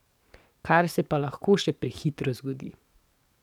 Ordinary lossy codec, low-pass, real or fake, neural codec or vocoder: none; 19.8 kHz; fake; codec, 44.1 kHz, 7.8 kbps, DAC